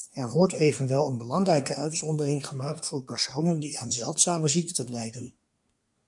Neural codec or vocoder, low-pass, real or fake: codec, 24 kHz, 1 kbps, SNAC; 10.8 kHz; fake